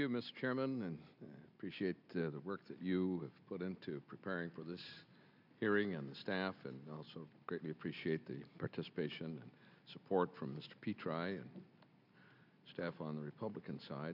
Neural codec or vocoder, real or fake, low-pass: none; real; 5.4 kHz